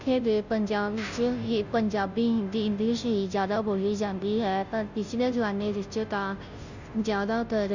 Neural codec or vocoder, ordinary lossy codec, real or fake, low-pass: codec, 16 kHz, 0.5 kbps, FunCodec, trained on Chinese and English, 25 frames a second; none; fake; 7.2 kHz